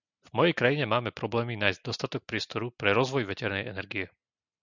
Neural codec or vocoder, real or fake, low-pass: none; real; 7.2 kHz